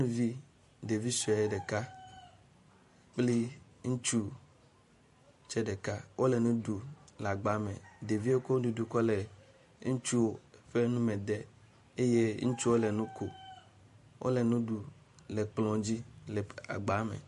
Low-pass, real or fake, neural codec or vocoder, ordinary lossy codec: 14.4 kHz; fake; vocoder, 44.1 kHz, 128 mel bands every 512 samples, BigVGAN v2; MP3, 48 kbps